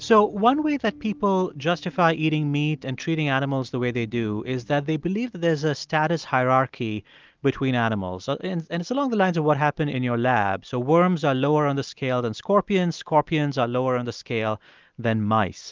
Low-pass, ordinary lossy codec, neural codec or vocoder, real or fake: 7.2 kHz; Opus, 24 kbps; none; real